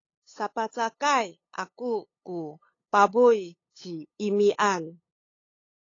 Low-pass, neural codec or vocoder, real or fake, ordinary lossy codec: 7.2 kHz; codec, 16 kHz, 8 kbps, FunCodec, trained on LibriTTS, 25 frames a second; fake; AAC, 32 kbps